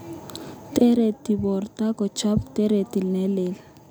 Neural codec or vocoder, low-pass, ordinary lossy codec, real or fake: none; none; none; real